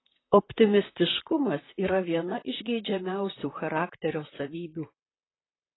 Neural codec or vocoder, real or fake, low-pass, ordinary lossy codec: vocoder, 44.1 kHz, 128 mel bands, Pupu-Vocoder; fake; 7.2 kHz; AAC, 16 kbps